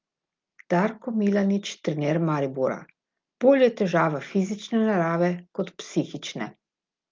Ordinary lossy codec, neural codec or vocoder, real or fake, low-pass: Opus, 32 kbps; none; real; 7.2 kHz